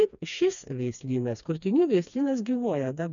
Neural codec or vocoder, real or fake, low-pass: codec, 16 kHz, 2 kbps, FreqCodec, smaller model; fake; 7.2 kHz